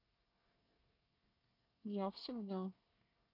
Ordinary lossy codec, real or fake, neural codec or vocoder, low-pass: none; fake; codec, 24 kHz, 1 kbps, SNAC; 5.4 kHz